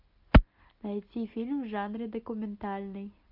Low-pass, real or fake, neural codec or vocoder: 5.4 kHz; real; none